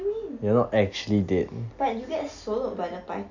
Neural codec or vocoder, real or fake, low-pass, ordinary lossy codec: none; real; 7.2 kHz; none